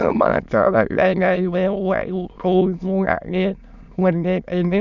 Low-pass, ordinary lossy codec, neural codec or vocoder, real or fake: 7.2 kHz; none; autoencoder, 22.05 kHz, a latent of 192 numbers a frame, VITS, trained on many speakers; fake